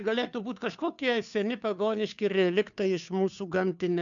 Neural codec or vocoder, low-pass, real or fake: codec, 16 kHz, 2 kbps, FunCodec, trained on Chinese and English, 25 frames a second; 7.2 kHz; fake